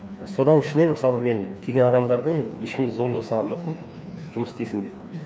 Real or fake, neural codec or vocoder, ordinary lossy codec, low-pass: fake; codec, 16 kHz, 2 kbps, FreqCodec, larger model; none; none